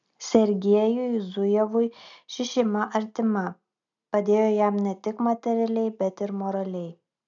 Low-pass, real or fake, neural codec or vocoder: 7.2 kHz; real; none